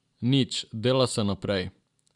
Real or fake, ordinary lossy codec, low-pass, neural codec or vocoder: real; none; 10.8 kHz; none